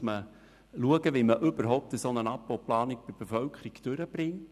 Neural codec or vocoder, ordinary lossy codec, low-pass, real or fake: none; none; 14.4 kHz; real